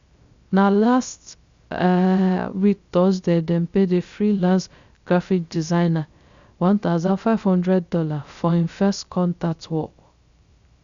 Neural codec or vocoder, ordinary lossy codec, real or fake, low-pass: codec, 16 kHz, 0.3 kbps, FocalCodec; Opus, 64 kbps; fake; 7.2 kHz